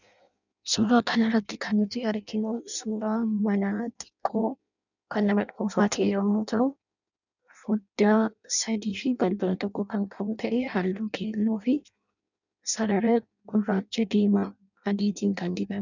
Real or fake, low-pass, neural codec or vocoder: fake; 7.2 kHz; codec, 16 kHz in and 24 kHz out, 0.6 kbps, FireRedTTS-2 codec